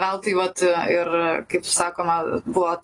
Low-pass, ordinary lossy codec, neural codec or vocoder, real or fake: 10.8 kHz; AAC, 32 kbps; none; real